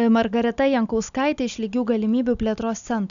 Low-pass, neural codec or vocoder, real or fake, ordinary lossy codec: 7.2 kHz; none; real; MP3, 96 kbps